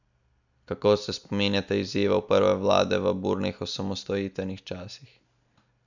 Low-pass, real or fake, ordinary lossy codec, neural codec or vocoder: 7.2 kHz; real; none; none